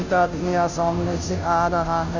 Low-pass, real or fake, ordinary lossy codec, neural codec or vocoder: 7.2 kHz; fake; none; codec, 16 kHz, 0.5 kbps, FunCodec, trained on Chinese and English, 25 frames a second